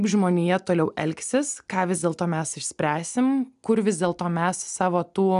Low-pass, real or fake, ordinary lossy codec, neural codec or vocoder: 10.8 kHz; real; MP3, 96 kbps; none